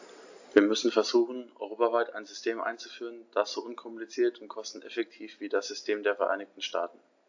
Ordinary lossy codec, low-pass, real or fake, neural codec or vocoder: AAC, 48 kbps; 7.2 kHz; real; none